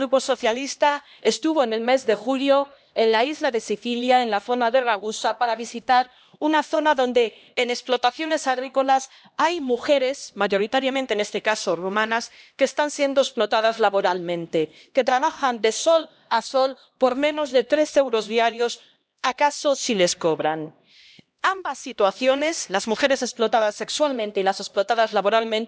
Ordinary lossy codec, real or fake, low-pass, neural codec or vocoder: none; fake; none; codec, 16 kHz, 1 kbps, X-Codec, HuBERT features, trained on LibriSpeech